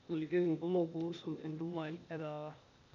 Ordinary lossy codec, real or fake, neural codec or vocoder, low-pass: none; fake; codec, 16 kHz, 0.8 kbps, ZipCodec; 7.2 kHz